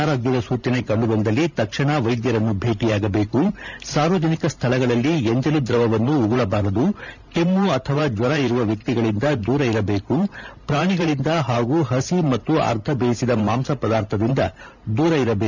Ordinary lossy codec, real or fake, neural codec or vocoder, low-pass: none; fake; vocoder, 44.1 kHz, 128 mel bands every 512 samples, BigVGAN v2; 7.2 kHz